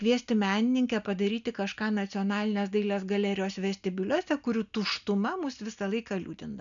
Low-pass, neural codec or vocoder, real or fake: 7.2 kHz; none; real